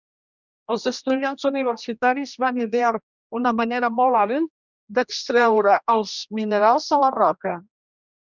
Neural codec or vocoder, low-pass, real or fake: codec, 16 kHz, 1 kbps, X-Codec, HuBERT features, trained on general audio; 7.2 kHz; fake